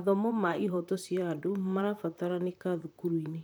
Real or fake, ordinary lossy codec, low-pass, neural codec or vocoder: fake; none; none; vocoder, 44.1 kHz, 128 mel bands, Pupu-Vocoder